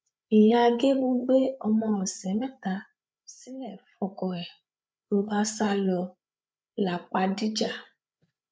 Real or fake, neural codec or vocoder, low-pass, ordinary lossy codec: fake; codec, 16 kHz, 8 kbps, FreqCodec, larger model; none; none